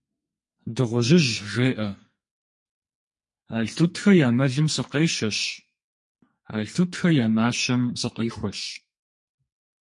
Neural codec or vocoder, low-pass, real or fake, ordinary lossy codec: codec, 44.1 kHz, 2.6 kbps, SNAC; 10.8 kHz; fake; MP3, 48 kbps